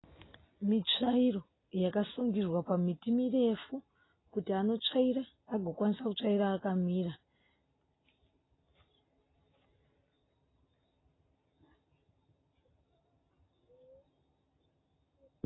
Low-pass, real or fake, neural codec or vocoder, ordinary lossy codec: 7.2 kHz; real; none; AAC, 16 kbps